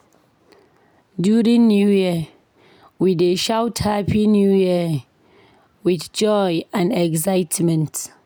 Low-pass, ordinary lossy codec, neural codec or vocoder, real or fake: none; none; none; real